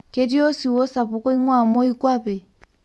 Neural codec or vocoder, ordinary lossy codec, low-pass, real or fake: vocoder, 24 kHz, 100 mel bands, Vocos; none; none; fake